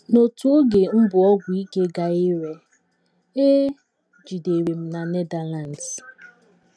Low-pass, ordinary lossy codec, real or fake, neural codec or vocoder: none; none; real; none